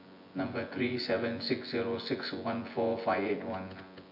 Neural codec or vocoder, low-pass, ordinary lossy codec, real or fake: vocoder, 24 kHz, 100 mel bands, Vocos; 5.4 kHz; MP3, 32 kbps; fake